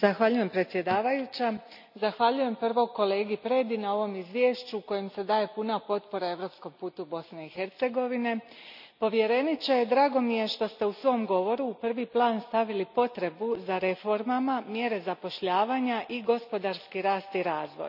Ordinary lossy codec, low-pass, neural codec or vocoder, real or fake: none; 5.4 kHz; none; real